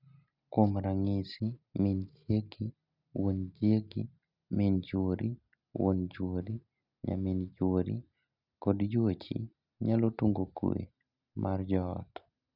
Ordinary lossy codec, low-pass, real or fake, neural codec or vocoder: none; 5.4 kHz; real; none